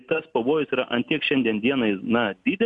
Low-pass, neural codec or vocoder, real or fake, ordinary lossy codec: 10.8 kHz; none; real; MP3, 64 kbps